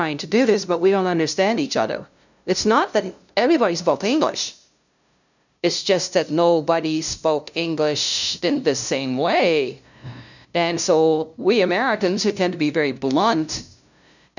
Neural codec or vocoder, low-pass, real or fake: codec, 16 kHz, 0.5 kbps, FunCodec, trained on LibriTTS, 25 frames a second; 7.2 kHz; fake